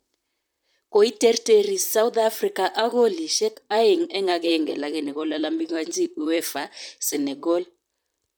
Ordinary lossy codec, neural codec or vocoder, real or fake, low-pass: none; vocoder, 44.1 kHz, 128 mel bands, Pupu-Vocoder; fake; none